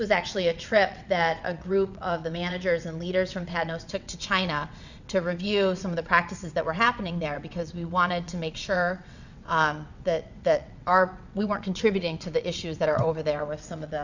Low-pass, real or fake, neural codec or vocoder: 7.2 kHz; fake; vocoder, 22.05 kHz, 80 mel bands, WaveNeXt